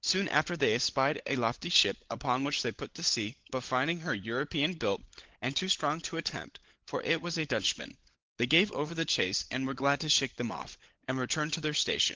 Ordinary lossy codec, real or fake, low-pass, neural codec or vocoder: Opus, 16 kbps; fake; 7.2 kHz; codec, 16 kHz, 16 kbps, FunCodec, trained on LibriTTS, 50 frames a second